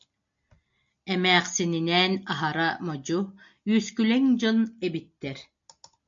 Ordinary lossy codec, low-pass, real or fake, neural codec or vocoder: MP3, 64 kbps; 7.2 kHz; real; none